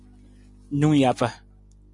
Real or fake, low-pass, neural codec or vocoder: real; 10.8 kHz; none